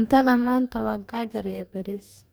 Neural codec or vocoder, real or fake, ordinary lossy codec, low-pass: codec, 44.1 kHz, 2.6 kbps, DAC; fake; none; none